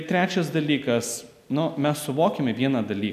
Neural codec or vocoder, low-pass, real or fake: none; 14.4 kHz; real